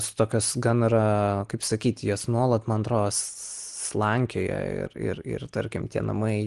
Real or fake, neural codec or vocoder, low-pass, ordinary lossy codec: real; none; 10.8 kHz; Opus, 24 kbps